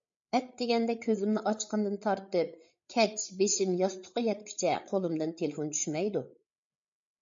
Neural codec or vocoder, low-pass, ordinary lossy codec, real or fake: codec, 16 kHz, 8 kbps, FreqCodec, larger model; 7.2 kHz; MP3, 48 kbps; fake